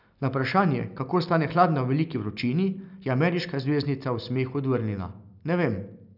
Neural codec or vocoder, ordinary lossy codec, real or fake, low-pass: none; none; real; 5.4 kHz